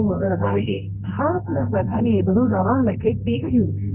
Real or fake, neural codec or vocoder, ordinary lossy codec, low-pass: fake; codec, 24 kHz, 0.9 kbps, WavTokenizer, medium music audio release; Opus, 24 kbps; 3.6 kHz